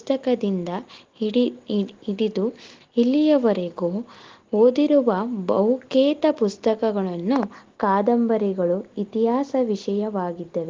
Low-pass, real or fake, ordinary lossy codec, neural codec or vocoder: 7.2 kHz; real; Opus, 16 kbps; none